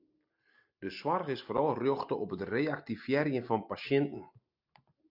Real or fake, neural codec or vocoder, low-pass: real; none; 5.4 kHz